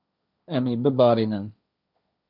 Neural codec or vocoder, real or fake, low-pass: codec, 16 kHz, 1.1 kbps, Voila-Tokenizer; fake; 5.4 kHz